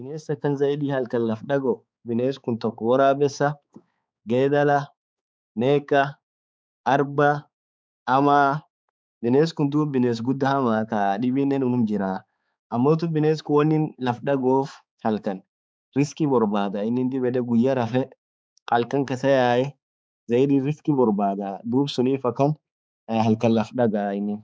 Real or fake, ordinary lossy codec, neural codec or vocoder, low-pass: fake; none; codec, 16 kHz, 4 kbps, X-Codec, HuBERT features, trained on balanced general audio; none